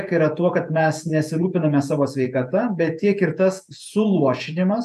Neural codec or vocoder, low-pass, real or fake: vocoder, 48 kHz, 128 mel bands, Vocos; 14.4 kHz; fake